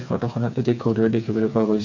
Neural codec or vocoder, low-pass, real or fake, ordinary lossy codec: codec, 16 kHz, 4 kbps, FreqCodec, smaller model; 7.2 kHz; fake; none